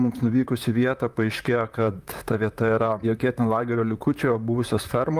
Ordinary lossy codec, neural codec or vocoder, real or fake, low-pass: Opus, 32 kbps; vocoder, 44.1 kHz, 128 mel bands, Pupu-Vocoder; fake; 14.4 kHz